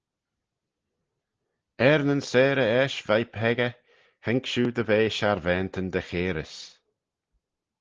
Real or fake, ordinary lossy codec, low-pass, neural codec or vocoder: real; Opus, 16 kbps; 7.2 kHz; none